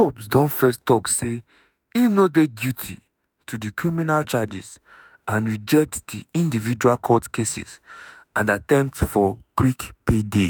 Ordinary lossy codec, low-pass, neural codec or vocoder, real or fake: none; none; autoencoder, 48 kHz, 32 numbers a frame, DAC-VAE, trained on Japanese speech; fake